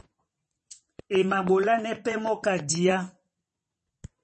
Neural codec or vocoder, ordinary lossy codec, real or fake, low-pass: vocoder, 22.05 kHz, 80 mel bands, WaveNeXt; MP3, 32 kbps; fake; 9.9 kHz